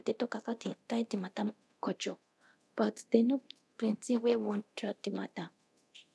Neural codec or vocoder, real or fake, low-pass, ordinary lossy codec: codec, 24 kHz, 0.9 kbps, DualCodec; fake; none; none